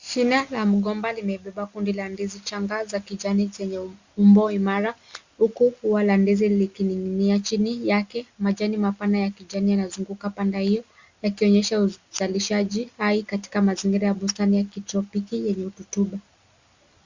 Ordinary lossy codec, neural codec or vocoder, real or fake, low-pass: Opus, 64 kbps; none; real; 7.2 kHz